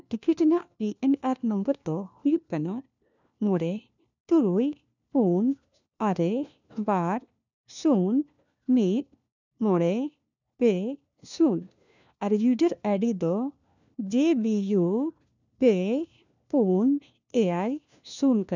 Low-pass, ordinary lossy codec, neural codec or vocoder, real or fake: 7.2 kHz; none; codec, 16 kHz, 1 kbps, FunCodec, trained on LibriTTS, 50 frames a second; fake